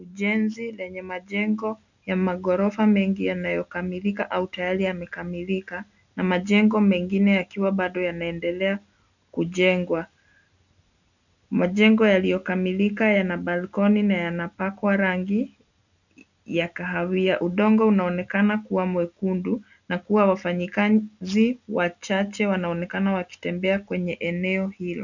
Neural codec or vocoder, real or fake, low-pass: none; real; 7.2 kHz